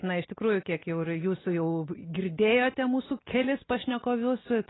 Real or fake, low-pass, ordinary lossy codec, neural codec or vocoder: real; 7.2 kHz; AAC, 16 kbps; none